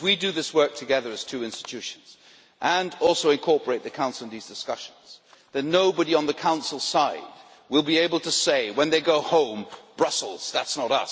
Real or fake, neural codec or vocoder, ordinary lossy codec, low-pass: real; none; none; none